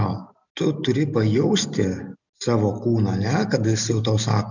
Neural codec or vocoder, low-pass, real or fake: none; 7.2 kHz; real